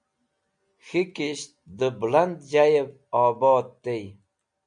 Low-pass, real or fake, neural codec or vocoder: 9.9 kHz; real; none